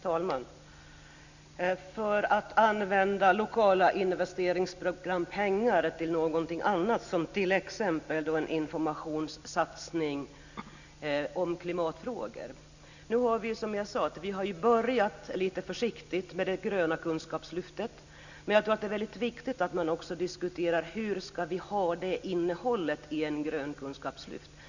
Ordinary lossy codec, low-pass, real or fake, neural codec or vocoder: none; 7.2 kHz; real; none